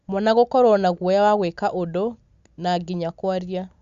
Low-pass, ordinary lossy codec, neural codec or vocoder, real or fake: 7.2 kHz; Opus, 64 kbps; none; real